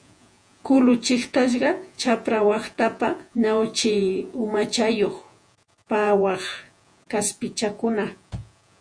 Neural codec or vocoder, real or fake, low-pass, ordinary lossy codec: vocoder, 48 kHz, 128 mel bands, Vocos; fake; 9.9 kHz; MP3, 64 kbps